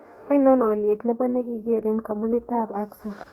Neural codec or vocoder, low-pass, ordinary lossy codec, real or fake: codec, 44.1 kHz, 2.6 kbps, DAC; 19.8 kHz; Opus, 64 kbps; fake